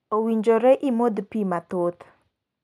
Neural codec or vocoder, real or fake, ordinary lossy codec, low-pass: none; real; none; 14.4 kHz